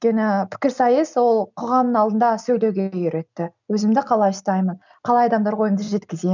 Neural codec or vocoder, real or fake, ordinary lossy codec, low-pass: none; real; none; 7.2 kHz